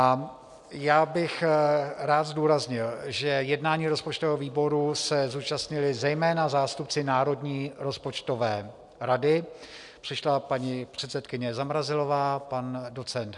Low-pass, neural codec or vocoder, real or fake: 10.8 kHz; none; real